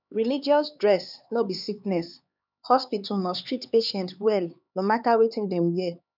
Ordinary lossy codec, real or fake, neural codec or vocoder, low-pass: none; fake; codec, 16 kHz, 4 kbps, X-Codec, HuBERT features, trained on LibriSpeech; 5.4 kHz